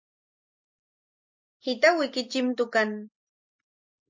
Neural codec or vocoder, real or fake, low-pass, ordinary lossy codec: none; real; 7.2 kHz; MP3, 48 kbps